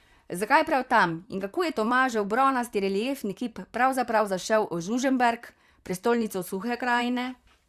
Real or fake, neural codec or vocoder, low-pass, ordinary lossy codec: fake; vocoder, 44.1 kHz, 128 mel bands, Pupu-Vocoder; 14.4 kHz; Opus, 64 kbps